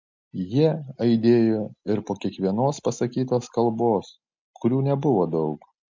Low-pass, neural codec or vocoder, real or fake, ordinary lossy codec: 7.2 kHz; none; real; MP3, 64 kbps